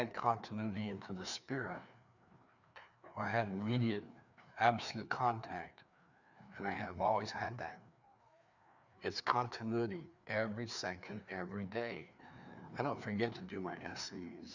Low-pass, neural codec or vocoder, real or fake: 7.2 kHz; codec, 16 kHz, 2 kbps, FreqCodec, larger model; fake